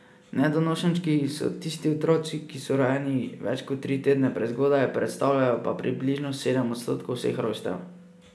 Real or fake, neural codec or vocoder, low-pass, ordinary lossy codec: real; none; none; none